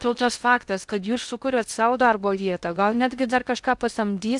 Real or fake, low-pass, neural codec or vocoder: fake; 10.8 kHz; codec, 16 kHz in and 24 kHz out, 0.6 kbps, FocalCodec, streaming, 2048 codes